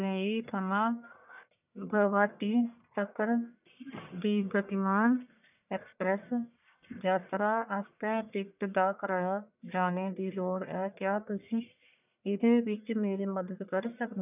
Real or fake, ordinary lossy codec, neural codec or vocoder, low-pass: fake; none; codec, 44.1 kHz, 1.7 kbps, Pupu-Codec; 3.6 kHz